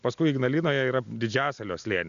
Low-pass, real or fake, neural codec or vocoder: 7.2 kHz; real; none